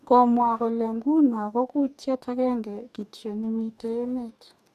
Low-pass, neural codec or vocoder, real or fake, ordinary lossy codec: 14.4 kHz; codec, 44.1 kHz, 2.6 kbps, DAC; fake; none